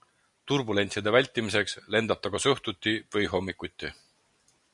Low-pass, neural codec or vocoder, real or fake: 10.8 kHz; none; real